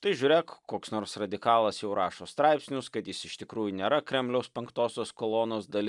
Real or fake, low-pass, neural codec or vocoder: real; 10.8 kHz; none